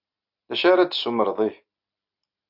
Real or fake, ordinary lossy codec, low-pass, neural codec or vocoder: real; AAC, 48 kbps; 5.4 kHz; none